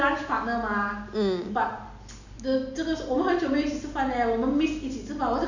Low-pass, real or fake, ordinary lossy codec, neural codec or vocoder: 7.2 kHz; real; none; none